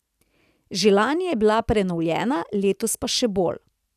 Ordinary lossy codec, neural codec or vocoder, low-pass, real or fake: none; none; 14.4 kHz; real